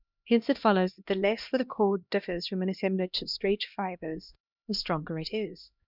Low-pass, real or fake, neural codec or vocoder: 5.4 kHz; fake; codec, 16 kHz, 1 kbps, X-Codec, HuBERT features, trained on LibriSpeech